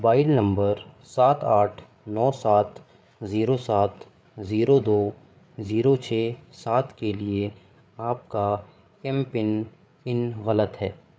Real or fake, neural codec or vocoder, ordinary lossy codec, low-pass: fake; codec, 16 kHz, 8 kbps, FreqCodec, larger model; none; none